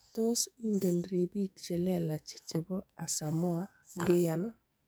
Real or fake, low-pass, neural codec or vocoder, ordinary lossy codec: fake; none; codec, 44.1 kHz, 2.6 kbps, SNAC; none